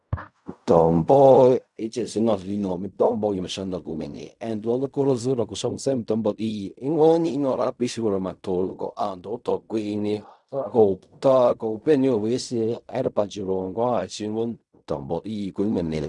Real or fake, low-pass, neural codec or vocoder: fake; 10.8 kHz; codec, 16 kHz in and 24 kHz out, 0.4 kbps, LongCat-Audio-Codec, fine tuned four codebook decoder